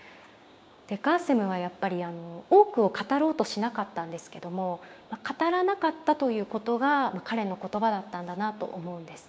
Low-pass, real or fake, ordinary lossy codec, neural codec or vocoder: none; fake; none; codec, 16 kHz, 6 kbps, DAC